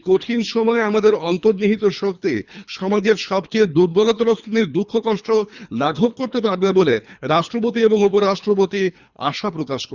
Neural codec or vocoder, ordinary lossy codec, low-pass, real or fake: codec, 24 kHz, 3 kbps, HILCodec; Opus, 64 kbps; 7.2 kHz; fake